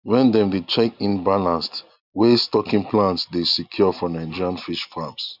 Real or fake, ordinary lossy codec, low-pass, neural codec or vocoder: real; none; 5.4 kHz; none